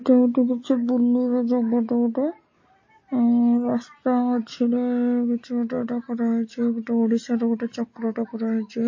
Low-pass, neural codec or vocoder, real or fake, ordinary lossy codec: 7.2 kHz; none; real; MP3, 32 kbps